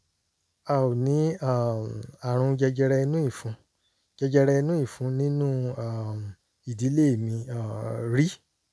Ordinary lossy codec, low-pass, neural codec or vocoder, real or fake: none; none; none; real